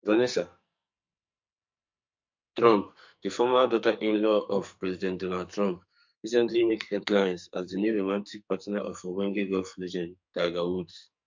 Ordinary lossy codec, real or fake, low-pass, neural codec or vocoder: MP3, 48 kbps; fake; 7.2 kHz; codec, 44.1 kHz, 2.6 kbps, SNAC